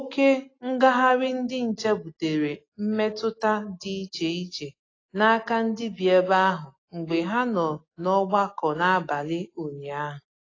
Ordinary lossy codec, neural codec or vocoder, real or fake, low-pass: AAC, 32 kbps; none; real; 7.2 kHz